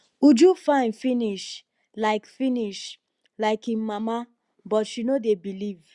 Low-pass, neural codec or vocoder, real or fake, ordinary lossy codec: 10.8 kHz; none; real; Opus, 64 kbps